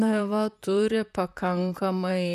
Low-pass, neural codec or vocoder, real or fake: 14.4 kHz; vocoder, 44.1 kHz, 128 mel bands, Pupu-Vocoder; fake